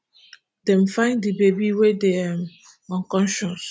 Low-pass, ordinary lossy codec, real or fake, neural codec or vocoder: none; none; real; none